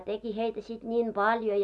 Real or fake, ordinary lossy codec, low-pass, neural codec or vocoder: real; none; none; none